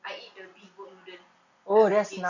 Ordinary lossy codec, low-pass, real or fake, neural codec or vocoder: none; 7.2 kHz; real; none